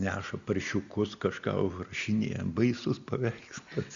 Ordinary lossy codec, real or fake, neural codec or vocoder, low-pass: Opus, 64 kbps; real; none; 7.2 kHz